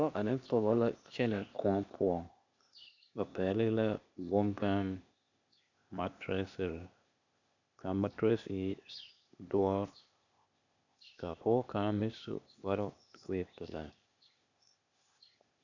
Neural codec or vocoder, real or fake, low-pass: codec, 16 kHz, 0.8 kbps, ZipCodec; fake; 7.2 kHz